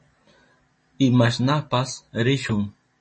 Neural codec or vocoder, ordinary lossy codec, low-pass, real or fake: none; MP3, 32 kbps; 10.8 kHz; real